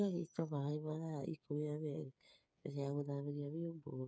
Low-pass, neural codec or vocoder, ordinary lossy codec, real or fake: none; codec, 16 kHz, 8 kbps, FreqCodec, smaller model; none; fake